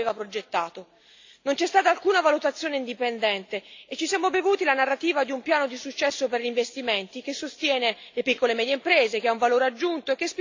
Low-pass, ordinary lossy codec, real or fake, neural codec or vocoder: 7.2 kHz; AAC, 48 kbps; real; none